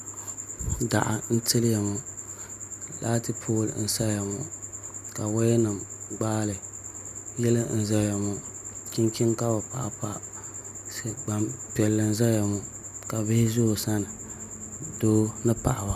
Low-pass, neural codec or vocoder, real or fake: 14.4 kHz; none; real